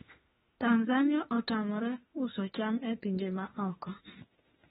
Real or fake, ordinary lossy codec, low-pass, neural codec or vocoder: fake; AAC, 16 kbps; 19.8 kHz; autoencoder, 48 kHz, 32 numbers a frame, DAC-VAE, trained on Japanese speech